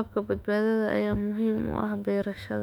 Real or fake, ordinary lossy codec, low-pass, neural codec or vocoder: fake; none; 19.8 kHz; autoencoder, 48 kHz, 32 numbers a frame, DAC-VAE, trained on Japanese speech